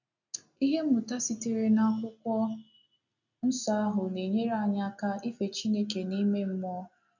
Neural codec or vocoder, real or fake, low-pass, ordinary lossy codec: none; real; 7.2 kHz; none